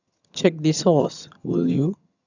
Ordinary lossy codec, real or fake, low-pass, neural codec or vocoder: none; fake; 7.2 kHz; vocoder, 22.05 kHz, 80 mel bands, HiFi-GAN